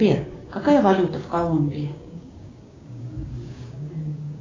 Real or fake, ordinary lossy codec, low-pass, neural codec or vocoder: fake; AAC, 32 kbps; 7.2 kHz; codec, 16 kHz, 6 kbps, DAC